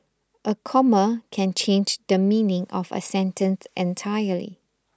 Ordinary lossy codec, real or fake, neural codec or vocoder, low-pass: none; real; none; none